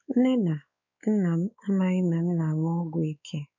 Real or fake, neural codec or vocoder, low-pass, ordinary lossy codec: fake; codec, 16 kHz, 4 kbps, X-Codec, WavLM features, trained on Multilingual LibriSpeech; 7.2 kHz; none